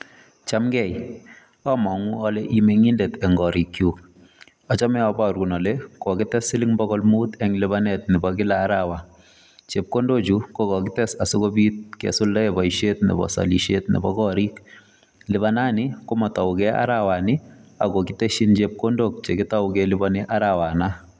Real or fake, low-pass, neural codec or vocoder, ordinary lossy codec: real; none; none; none